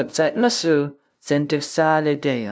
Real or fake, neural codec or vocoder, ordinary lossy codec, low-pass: fake; codec, 16 kHz, 0.5 kbps, FunCodec, trained on LibriTTS, 25 frames a second; none; none